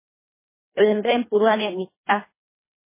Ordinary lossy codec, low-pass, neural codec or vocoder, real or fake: MP3, 16 kbps; 3.6 kHz; codec, 24 kHz, 1.5 kbps, HILCodec; fake